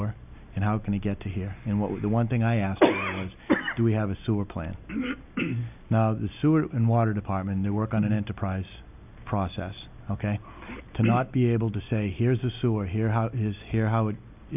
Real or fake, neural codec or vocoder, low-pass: real; none; 3.6 kHz